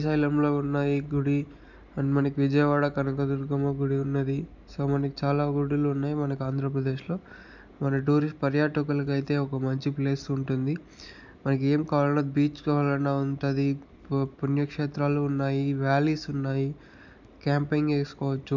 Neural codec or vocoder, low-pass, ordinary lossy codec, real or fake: none; 7.2 kHz; none; real